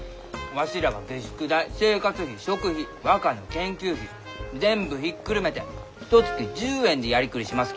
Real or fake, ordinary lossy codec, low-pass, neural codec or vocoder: real; none; none; none